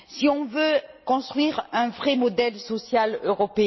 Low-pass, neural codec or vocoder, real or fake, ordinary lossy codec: 7.2 kHz; none; real; MP3, 24 kbps